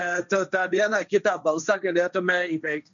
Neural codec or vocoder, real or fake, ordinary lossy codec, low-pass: codec, 16 kHz, 1.1 kbps, Voila-Tokenizer; fake; MP3, 96 kbps; 7.2 kHz